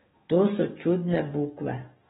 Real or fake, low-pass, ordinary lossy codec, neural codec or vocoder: fake; 19.8 kHz; AAC, 16 kbps; codec, 44.1 kHz, 7.8 kbps, DAC